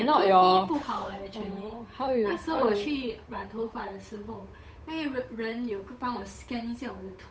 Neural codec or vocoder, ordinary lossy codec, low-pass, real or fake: codec, 16 kHz, 8 kbps, FunCodec, trained on Chinese and English, 25 frames a second; none; none; fake